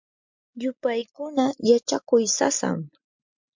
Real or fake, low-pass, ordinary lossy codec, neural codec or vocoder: real; 7.2 kHz; MP3, 64 kbps; none